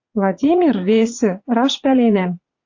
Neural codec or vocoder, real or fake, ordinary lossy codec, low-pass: vocoder, 44.1 kHz, 80 mel bands, Vocos; fake; AAC, 48 kbps; 7.2 kHz